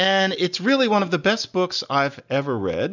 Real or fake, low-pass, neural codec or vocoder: real; 7.2 kHz; none